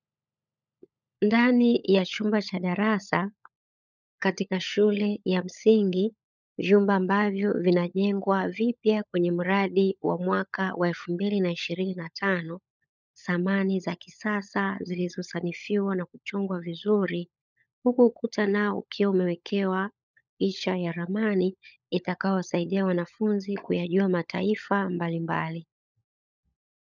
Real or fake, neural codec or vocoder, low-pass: fake; codec, 16 kHz, 16 kbps, FunCodec, trained on LibriTTS, 50 frames a second; 7.2 kHz